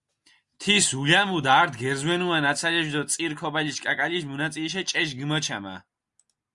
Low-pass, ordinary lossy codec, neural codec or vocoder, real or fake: 10.8 kHz; Opus, 64 kbps; none; real